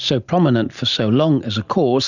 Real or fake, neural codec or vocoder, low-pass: real; none; 7.2 kHz